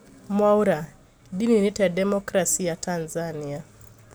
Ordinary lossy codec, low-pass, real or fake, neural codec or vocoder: none; none; real; none